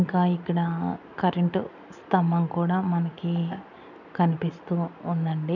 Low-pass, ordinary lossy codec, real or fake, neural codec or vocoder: 7.2 kHz; none; real; none